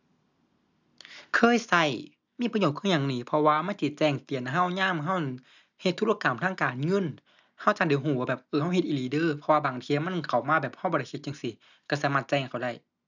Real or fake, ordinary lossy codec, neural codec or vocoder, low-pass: real; none; none; 7.2 kHz